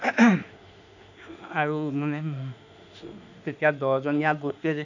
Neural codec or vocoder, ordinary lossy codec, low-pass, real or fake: autoencoder, 48 kHz, 32 numbers a frame, DAC-VAE, trained on Japanese speech; none; 7.2 kHz; fake